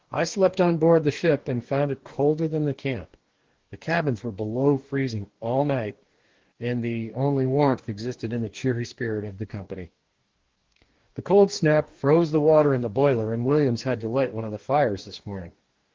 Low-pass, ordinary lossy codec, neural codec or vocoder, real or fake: 7.2 kHz; Opus, 16 kbps; codec, 44.1 kHz, 2.6 kbps, DAC; fake